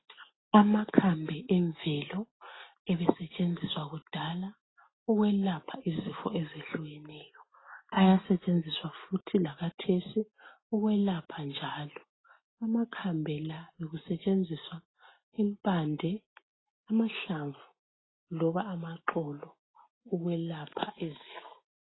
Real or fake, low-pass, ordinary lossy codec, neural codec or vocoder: real; 7.2 kHz; AAC, 16 kbps; none